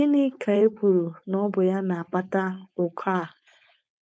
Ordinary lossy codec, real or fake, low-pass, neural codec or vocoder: none; fake; none; codec, 16 kHz, 4.8 kbps, FACodec